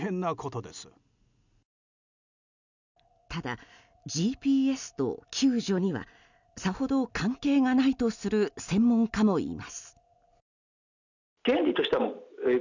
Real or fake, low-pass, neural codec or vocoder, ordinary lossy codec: real; 7.2 kHz; none; none